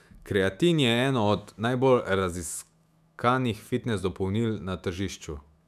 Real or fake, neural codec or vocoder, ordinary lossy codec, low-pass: fake; autoencoder, 48 kHz, 128 numbers a frame, DAC-VAE, trained on Japanese speech; none; 14.4 kHz